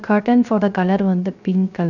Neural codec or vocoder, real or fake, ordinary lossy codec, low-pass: codec, 16 kHz, 0.3 kbps, FocalCodec; fake; none; 7.2 kHz